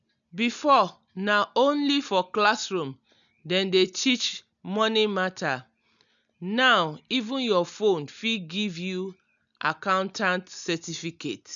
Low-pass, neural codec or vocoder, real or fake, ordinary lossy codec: 7.2 kHz; none; real; none